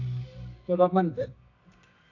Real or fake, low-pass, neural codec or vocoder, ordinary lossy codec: fake; 7.2 kHz; codec, 24 kHz, 0.9 kbps, WavTokenizer, medium music audio release; AAC, 48 kbps